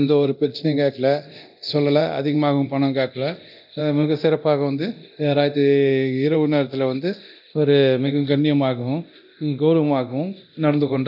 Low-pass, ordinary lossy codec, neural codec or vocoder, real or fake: 5.4 kHz; none; codec, 24 kHz, 0.9 kbps, DualCodec; fake